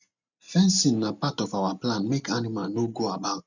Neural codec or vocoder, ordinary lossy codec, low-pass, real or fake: none; none; 7.2 kHz; real